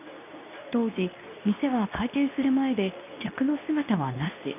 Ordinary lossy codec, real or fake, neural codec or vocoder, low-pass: none; fake; codec, 24 kHz, 0.9 kbps, WavTokenizer, medium speech release version 2; 3.6 kHz